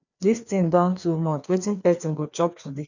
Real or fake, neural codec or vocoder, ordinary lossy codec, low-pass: fake; codec, 16 kHz, 2 kbps, FreqCodec, larger model; none; 7.2 kHz